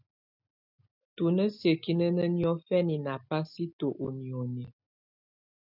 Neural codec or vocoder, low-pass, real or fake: none; 5.4 kHz; real